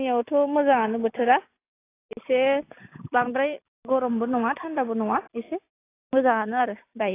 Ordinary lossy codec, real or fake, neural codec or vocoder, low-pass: AAC, 24 kbps; real; none; 3.6 kHz